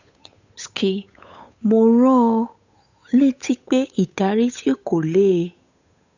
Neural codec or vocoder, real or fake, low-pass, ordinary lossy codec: codec, 16 kHz, 8 kbps, FunCodec, trained on Chinese and English, 25 frames a second; fake; 7.2 kHz; none